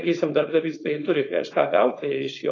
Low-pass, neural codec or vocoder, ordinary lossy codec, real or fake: 7.2 kHz; codec, 16 kHz, 4.8 kbps, FACodec; AAC, 32 kbps; fake